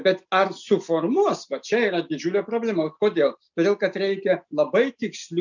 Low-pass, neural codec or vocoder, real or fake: 7.2 kHz; none; real